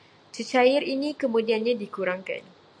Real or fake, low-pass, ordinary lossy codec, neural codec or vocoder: real; 9.9 kHz; AAC, 64 kbps; none